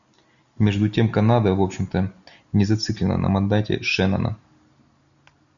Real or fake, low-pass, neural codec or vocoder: real; 7.2 kHz; none